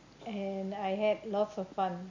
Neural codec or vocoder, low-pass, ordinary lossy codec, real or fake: none; 7.2 kHz; MP3, 64 kbps; real